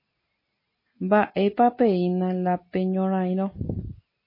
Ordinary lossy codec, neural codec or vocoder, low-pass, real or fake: MP3, 24 kbps; none; 5.4 kHz; real